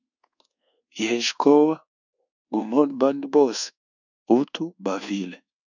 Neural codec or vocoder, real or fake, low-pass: codec, 24 kHz, 1.2 kbps, DualCodec; fake; 7.2 kHz